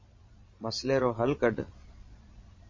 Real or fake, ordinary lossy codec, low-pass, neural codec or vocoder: fake; MP3, 32 kbps; 7.2 kHz; vocoder, 22.05 kHz, 80 mel bands, Vocos